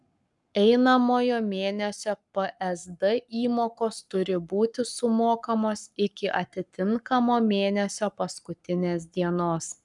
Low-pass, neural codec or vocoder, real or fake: 10.8 kHz; codec, 44.1 kHz, 7.8 kbps, Pupu-Codec; fake